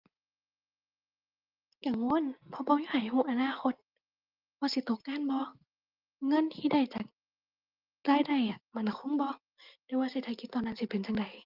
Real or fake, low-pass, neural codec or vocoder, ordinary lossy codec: real; 5.4 kHz; none; Opus, 32 kbps